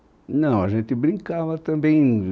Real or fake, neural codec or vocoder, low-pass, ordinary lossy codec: real; none; none; none